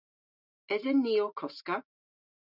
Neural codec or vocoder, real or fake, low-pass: none; real; 5.4 kHz